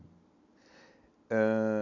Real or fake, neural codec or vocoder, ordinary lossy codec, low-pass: real; none; none; 7.2 kHz